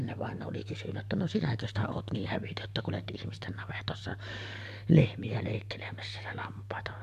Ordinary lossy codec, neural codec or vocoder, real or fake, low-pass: AAC, 96 kbps; codec, 44.1 kHz, 7.8 kbps, DAC; fake; 14.4 kHz